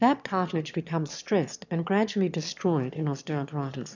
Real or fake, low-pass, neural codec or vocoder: fake; 7.2 kHz; autoencoder, 22.05 kHz, a latent of 192 numbers a frame, VITS, trained on one speaker